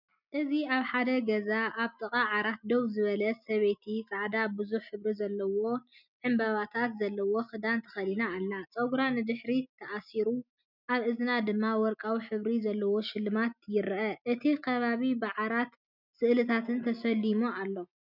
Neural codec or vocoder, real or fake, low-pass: none; real; 5.4 kHz